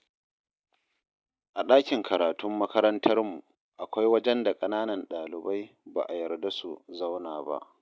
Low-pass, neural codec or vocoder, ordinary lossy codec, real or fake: none; none; none; real